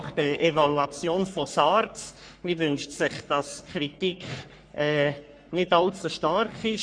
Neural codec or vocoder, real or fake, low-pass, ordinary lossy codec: codec, 44.1 kHz, 3.4 kbps, Pupu-Codec; fake; 9.9 kHz; MP3, 64 kbps